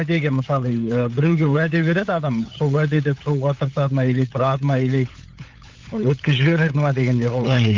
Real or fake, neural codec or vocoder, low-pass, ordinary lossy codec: fake; codec, 16 kHz, 8 kbps, FunCodec, trained on LibriTTS, 25 frames a second; 7.2 kHz; Opus, 24 kbps